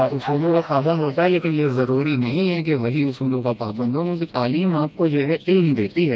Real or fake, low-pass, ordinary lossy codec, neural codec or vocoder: fake; none; none; codec, 16 kHz, 1 kbps, FreqCodec, smaller model